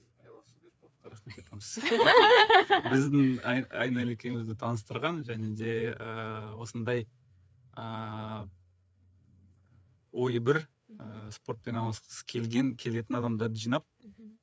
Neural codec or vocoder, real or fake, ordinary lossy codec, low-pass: codec, 16 kHz, 4 kbps, FreqCodec, larger model; fake; none; none